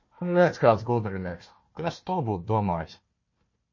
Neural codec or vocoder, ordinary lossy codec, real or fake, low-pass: codec, 16 kHz, 1 kbps, FunCodec, trained on Chinese and English, 50 frames a second; MP3, 32 kbps; fake; 7.2 kHz